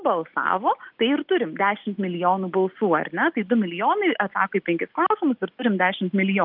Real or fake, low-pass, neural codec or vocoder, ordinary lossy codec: real; 5.4 kHz; none; Opus, 24 kbps